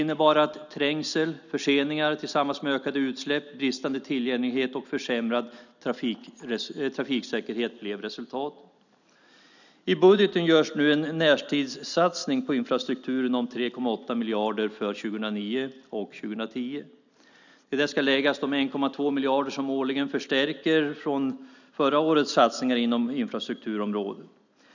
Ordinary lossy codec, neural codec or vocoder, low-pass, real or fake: none; none; 7.2 kHz; real